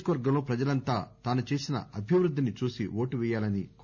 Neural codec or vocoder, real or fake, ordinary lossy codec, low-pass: none; real; none; 7.2 kHz